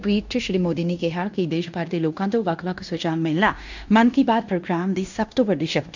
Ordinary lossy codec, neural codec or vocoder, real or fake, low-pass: none; codec, 16 kHz in and 24 kHz out, 0.9 kbps, LongCat-Audio-Codec, fine tuned four codebook decoder; fake; 7.2 kHz